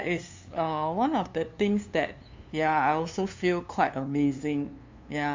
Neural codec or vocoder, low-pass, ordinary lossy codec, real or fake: codec, 16 kHz, 2 kbps, FunCodec, trained on LibriTTS, 25 frames a second; 7.2 kHz; MP3, 64 kbps; fake